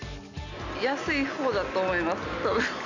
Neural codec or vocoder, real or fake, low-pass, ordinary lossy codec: none; real; 7.2 kHz; none